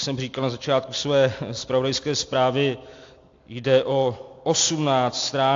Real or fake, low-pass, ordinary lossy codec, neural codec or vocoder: real; 7.2 kHz; AAC, 48 kbps; none